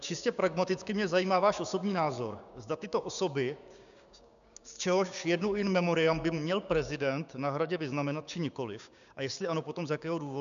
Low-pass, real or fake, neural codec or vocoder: 7.2 kHz; fake; codec, 16 kHz, 6 kbps, DAC